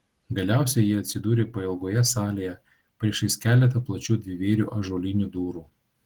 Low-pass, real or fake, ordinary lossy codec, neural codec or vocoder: 19.8 kHz; real; Opus, 16 kbps; none